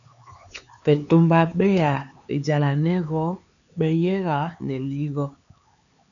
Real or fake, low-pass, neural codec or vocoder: fake; 7.2 kHz; codec, 16 kHz, 4 kbps, X-Codec, HuBERT features, trained on LibriSpeech